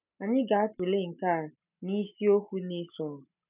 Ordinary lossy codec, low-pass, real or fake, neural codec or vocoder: none; 3.6 kHz; real; none